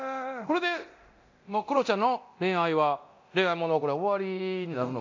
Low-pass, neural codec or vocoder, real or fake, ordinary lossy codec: 7.2 kHz; codec, 24 kHz, 0.9 kbps, DualCodec; fake; AAC, 48 kbps